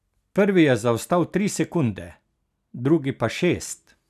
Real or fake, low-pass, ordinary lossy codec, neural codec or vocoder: real; 14.4 kHz; none; none